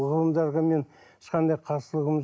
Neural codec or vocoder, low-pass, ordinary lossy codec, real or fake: none; none; none; real